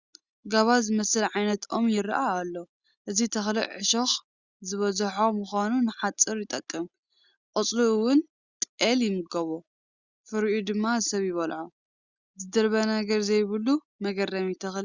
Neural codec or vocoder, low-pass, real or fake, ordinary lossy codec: none; 7.2 kHz; real; Opus, 64 kbps